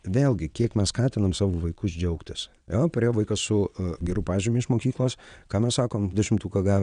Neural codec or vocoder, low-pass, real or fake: vocoder, 22.05 kHz, 80 mel bands, WaveNeXt; 9.9 kHz; fake